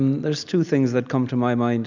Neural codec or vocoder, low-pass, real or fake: none; 7.2 kHz; real